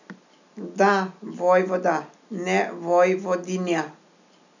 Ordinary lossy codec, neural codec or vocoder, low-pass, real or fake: none; none; 7.2 kHz; real